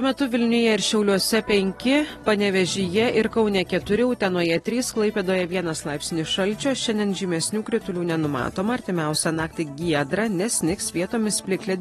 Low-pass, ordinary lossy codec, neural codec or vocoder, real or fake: 19.8 kHz; AAC, 32 kbps; none; real